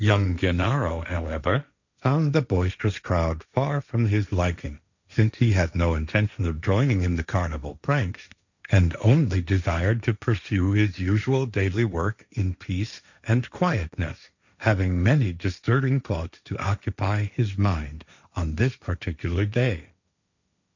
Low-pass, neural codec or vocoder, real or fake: 7.2 kHz; codec, 16 kHz, 1.1 kbps, Voila-Tokenizer; fake